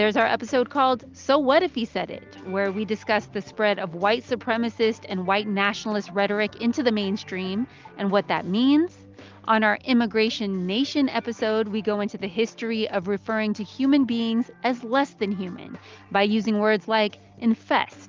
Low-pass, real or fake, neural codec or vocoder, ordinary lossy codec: 7.2 kHz; real; none; Opus, 32 kbps